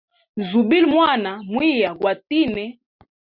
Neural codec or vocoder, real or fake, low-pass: none; real; 5.4 kHz